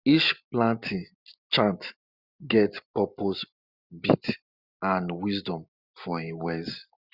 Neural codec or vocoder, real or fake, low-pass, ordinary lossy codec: none; real; 5.4 kHz; Opus, 64 kbps